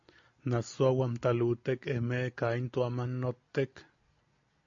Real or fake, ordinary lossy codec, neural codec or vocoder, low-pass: real; AAC, 48 kbps; none; 7.2 kHz